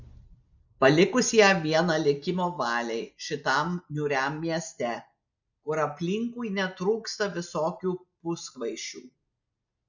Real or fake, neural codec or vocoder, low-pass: real; none; 7.2 kHz